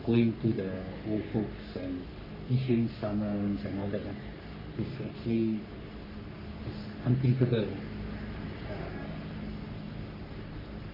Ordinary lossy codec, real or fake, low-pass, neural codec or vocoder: MP3, 48 kbps; fake; 5.4 kHz; codec, 44.1 kHz, 3.4 kbps, Pupu-Codec